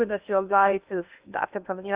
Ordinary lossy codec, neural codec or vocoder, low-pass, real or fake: Opus, 64 kbps; codec, 16 kHz in and 24 kHz out, 0.8 kbps, FocalCodec, streaming, 65536 codes; 3.6 kHz; fake